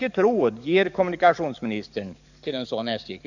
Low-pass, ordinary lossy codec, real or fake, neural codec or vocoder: 7.2 kHz; none; fake; codec, 44.1 kHz, 7.8 kbps, DAC